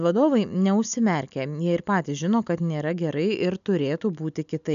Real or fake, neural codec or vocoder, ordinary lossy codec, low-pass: real; none; AAC, 96 kbps; 7.2 kHz